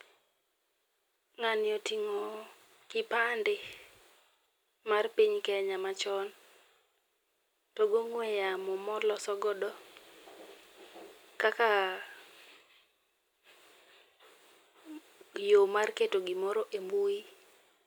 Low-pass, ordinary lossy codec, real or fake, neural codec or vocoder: none; none; real; none